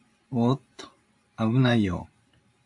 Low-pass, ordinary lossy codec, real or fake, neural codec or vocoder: 10.8 kHz; AAC, 64 kbps; fake; vocoder, 44.1 kHz, 128 mel bands every 512 samples, BigVGAN v2